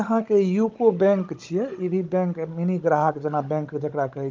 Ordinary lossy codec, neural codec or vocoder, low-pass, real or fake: Opus, 24 kbps; codec, 16 kHz, 16 kbps, FunCodec, trained on Chinese and English, 50 frames a second; 7.2 kHz; fake